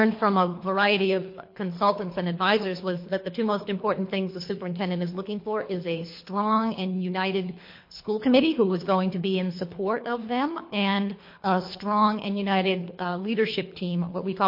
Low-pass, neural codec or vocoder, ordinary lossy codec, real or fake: 5.4 kHz; codec, 24 kHz, 3 kbps, HILCodec; MP3, 32 kbps; fake